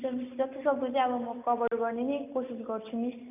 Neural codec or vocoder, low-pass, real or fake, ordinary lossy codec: none; 3.6 kHz; real; none